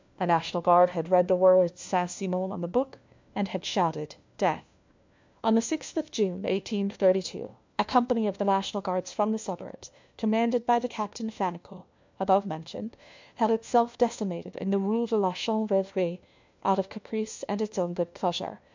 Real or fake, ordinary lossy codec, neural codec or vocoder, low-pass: fake; MP3, 64 kbps; codec, 16 kHz, 1 kbps, FunCodec, trained on LibriTTS, 50 frames a second; 7.2 kHz